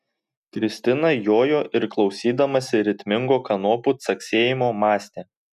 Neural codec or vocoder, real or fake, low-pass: none; real; 14.4 kHz